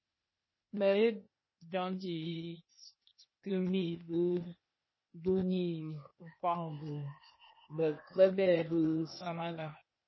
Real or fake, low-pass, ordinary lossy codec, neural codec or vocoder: fake; 7.2 kHz; MP3, 24 kbps; codec, 16 kHz, 0.8 kbps, ZipCodec